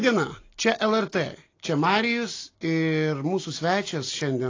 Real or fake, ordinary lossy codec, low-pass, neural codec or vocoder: real; AAC, 32 kbps; 7.2 kHz; none